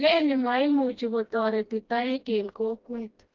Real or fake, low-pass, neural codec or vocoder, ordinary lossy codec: fake; 7.2 kHz; codec, 16 kHz, 1 kbps, FreqCodec, smaller model; Opus, 24 kbps